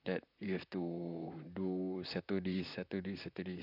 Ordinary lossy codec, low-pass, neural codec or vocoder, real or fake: none; 5.4 kHz; vocoder, 44.1 kHz, 128 mel bands, Pupu-Vocoder; fake